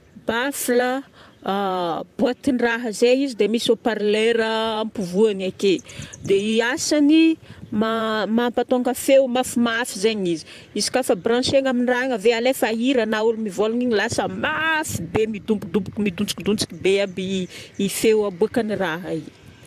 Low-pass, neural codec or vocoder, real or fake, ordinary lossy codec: 14.4 kHz; vocoder, 44.1 kHz, 128 mel bands, Pupu-Vocoder; fake; AAC, 96 kbps